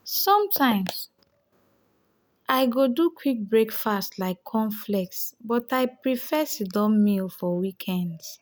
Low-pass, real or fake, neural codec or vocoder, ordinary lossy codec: none; real; none; none